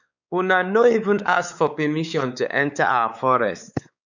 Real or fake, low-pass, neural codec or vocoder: fake; 7.2 kHz; codec, 16 kHz, 4 kbps, X-Codec, WavLM features, trained on Multilingual LibriSpeech